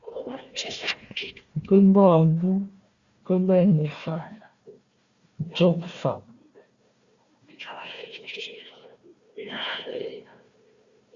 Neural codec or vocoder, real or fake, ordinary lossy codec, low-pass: codec, 16 kHz, 1 kbps, FunCodec, trained on Chinese and English, 50 frames a second; fake; Opus, 64 kbps; 7.2 kHz